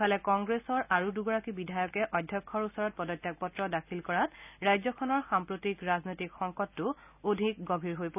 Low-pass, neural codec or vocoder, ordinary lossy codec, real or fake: 3.6 kHz; none; none; real